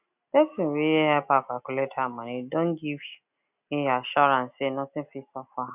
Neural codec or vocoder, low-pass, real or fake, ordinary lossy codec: none; 3.6 kHz; real; none